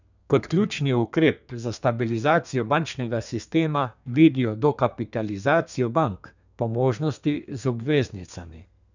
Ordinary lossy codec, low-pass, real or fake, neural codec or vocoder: none; 7.2 kHz; fake; codec, 32 kHz, 1.9 kbps, SNAC